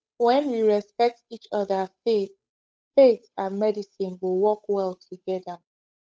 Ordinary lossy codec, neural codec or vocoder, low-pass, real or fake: none; codec, 16 kHz, 8 kbps, FunCodec, trained on Chinese and English, 25 frames a second; none; fake